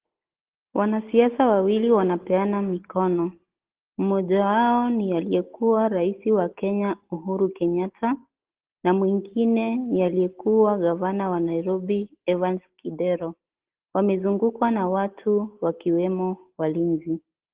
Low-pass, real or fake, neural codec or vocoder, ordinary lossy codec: 3.6 kHz; real; none; Opus, 16 kbps